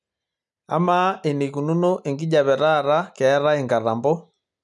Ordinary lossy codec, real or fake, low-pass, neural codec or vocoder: none; real; none; none